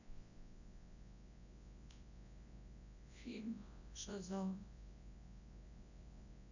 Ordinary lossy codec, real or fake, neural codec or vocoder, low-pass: none; fake; codec, 24 kHz, 0.9 kbps, WavTokenizer, large speech release; 7.2 kHz